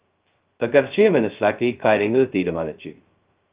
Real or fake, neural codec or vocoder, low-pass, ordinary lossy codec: fake; codec, 16 kHz, 0.2 kbps, FocalCodec; 3.6 kHz; Opus, 32 kbps